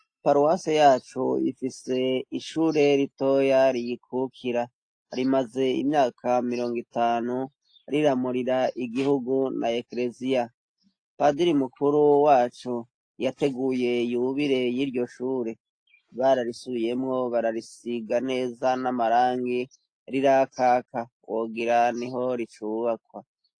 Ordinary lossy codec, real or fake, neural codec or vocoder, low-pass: AAC, 48 kbps; real; none; 9.9 kHz